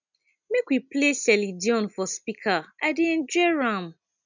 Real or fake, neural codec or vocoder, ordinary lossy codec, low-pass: real; none; none; 7.2 kHz